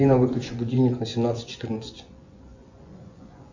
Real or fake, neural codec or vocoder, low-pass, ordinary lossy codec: fake; vocoder, 24 kHz, 100 mel bands, Vocos; 7.2 kHz; Opus, 64 kbps